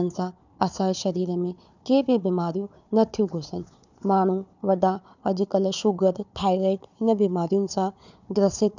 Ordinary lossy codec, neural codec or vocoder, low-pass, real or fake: none; codec, 16 kHz, 4 kbps, FunCodec, trained on Chinese and English, 50 frames a second; 7.2 kHz; fake